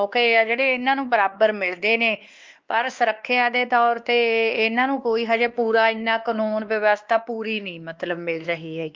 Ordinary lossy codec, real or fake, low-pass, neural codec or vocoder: Opus, 24 kbps; fake; 7.2 kHz; codec, 16 kHz, 2 kbps, X-Codec, WavLM features, trained on Multilingual LibriSpeech